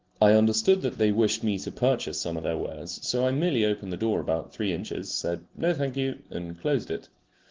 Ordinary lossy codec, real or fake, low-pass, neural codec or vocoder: Opus, 16 kbps; real; 7.2 kHz; none